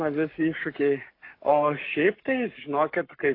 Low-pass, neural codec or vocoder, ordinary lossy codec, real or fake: 5.4 kHz; codec, 44.1 kHz, 7.8 kbps, Pupu-Codec; AAC, 32 kbps; fake